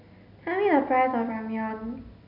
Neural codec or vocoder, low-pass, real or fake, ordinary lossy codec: none; 5.4 kHz; real; none